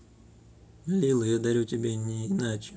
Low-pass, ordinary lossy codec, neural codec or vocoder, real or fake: none; none; none; real